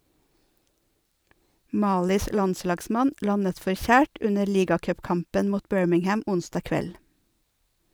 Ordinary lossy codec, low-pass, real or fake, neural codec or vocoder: none; none; fake; vocoder, 44.1 kHz, 128 mel bands every 512 samples, BigVGAN v2